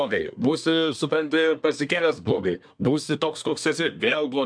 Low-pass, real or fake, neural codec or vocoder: 9.9 kHz; fake; codec, 24 kHz, 1 kbps, SNAC